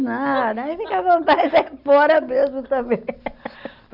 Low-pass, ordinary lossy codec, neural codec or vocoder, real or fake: 5.4 kHz; none; none; real